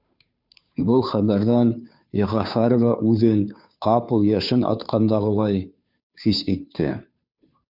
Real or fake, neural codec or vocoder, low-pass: fake; codec, 16 kHz, 2 kbps, FunCodec, trained on Chinese and English, 25 frames a second; 5.4 kHz